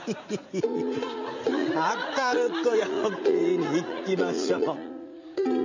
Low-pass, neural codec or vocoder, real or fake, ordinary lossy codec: 7.2 kHz; vocoder, 44.1 kHz, 80 mel bands, Vocos; fake; MP3, 64 kbps